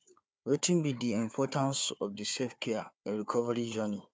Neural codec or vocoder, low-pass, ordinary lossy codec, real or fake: codec, 16 kHz, 4 kbps, FunCodec, trained on Chinese and English, 50 frames a second; none; none; fake